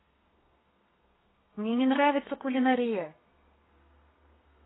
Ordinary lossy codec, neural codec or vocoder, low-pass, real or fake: AAC, 16 kbps; codec, 32 kHz, 1.9 kbps, SNAC; 7.2 kHz; fake